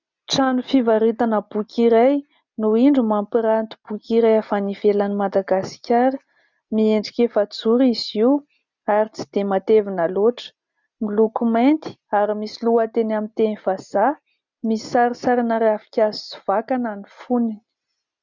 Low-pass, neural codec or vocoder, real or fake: 7.2 kHz; none; real